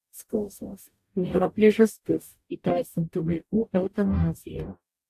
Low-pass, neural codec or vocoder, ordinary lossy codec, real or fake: 14.4 kHz; codec, 44.1 kHz, 0.9 kbps, DAC; AAC, 64 kbps; fake